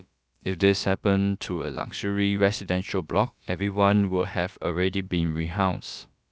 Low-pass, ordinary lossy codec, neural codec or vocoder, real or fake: none; none; codec, 16 kHz, about 1 kbps, DyCAST, with the encoder's durations; fake